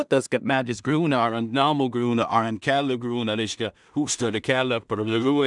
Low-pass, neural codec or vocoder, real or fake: 10.8 kHz; codec, 16 kHz in and 24 kHz out, 0.4 kbps, LongCat-Audio-Codec, two codebook decoder; fake